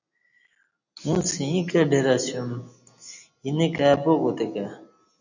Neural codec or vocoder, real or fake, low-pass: none; real; 7.2 kHz